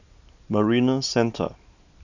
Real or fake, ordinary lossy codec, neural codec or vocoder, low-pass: real; none; none; 7.2 kHz